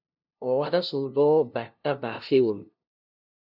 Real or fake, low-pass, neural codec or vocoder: fake; 5.4 kHz; codec, 16 kHz, 0.5 kbps, FunCodec, trained on LibriTTS, 25 frames a second